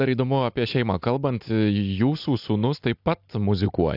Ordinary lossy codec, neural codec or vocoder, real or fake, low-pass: AAC, 48 kbps; none; real; 5.4 kHz